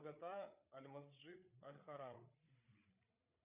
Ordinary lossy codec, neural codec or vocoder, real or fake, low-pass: Opus, 64 kbps; codec, 16 kHz, 8 kbps, FreqCodec, larger model; fake; 3.6 kHz